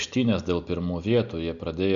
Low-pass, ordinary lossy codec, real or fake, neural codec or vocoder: 7.2 kHz; Opus, 64 kbps; real; none